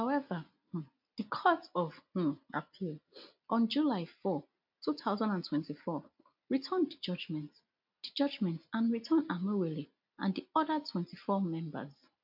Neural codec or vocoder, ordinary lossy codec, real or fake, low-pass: none; MP3, 48 kbps; real; 5.4 kHz